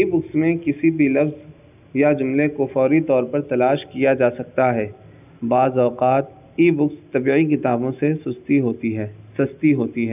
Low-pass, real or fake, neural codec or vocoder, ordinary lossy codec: 3.6 kHz; real; none; none